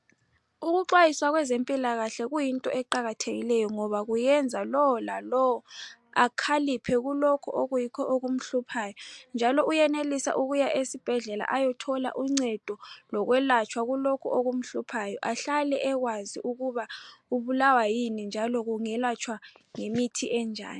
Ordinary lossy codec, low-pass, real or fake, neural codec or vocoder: MP3, 64 kbps; 10.8 kHz; real; none